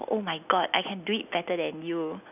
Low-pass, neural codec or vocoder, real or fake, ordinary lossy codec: 3.6 kHz; none; real; none